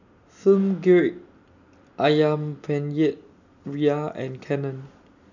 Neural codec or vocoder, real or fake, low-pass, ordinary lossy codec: none; real; 7.2 kHz; none